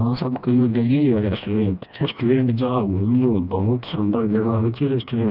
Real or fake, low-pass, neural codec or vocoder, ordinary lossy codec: fake; 5.4 kHz; codec, 16 kHz, 1 kbps, FreqCodec, smaller model; none